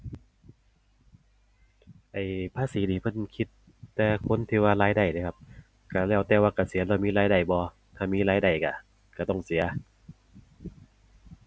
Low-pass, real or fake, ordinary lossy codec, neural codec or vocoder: none; real; none; none